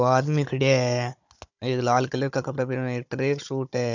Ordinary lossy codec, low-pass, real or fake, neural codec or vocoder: none; 7.2 kHz; fake; codec, 16 kHz, 16 kbps, FunCodec, trained on Chinese and English, 50 frames a second